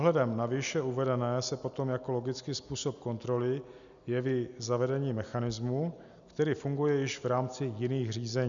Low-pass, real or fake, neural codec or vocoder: 7.2 kHz; real; none